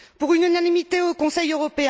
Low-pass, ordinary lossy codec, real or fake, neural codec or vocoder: none; none; real; none